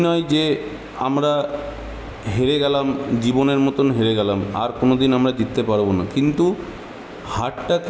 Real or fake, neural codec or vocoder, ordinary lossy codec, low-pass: real; none; none; none